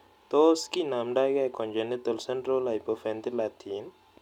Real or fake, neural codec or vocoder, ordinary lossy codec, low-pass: real; none; none; 19.8 kHz